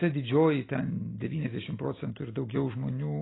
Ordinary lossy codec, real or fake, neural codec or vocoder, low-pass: AAC, 16 kbps; real; none; 7.2 kHz